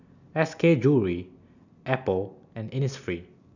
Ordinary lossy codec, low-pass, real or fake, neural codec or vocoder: none; 7.2 kHz; real; none